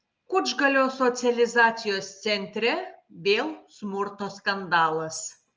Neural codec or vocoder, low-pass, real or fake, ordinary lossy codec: none; 7.2 kHz; real; Opus, 24 kbps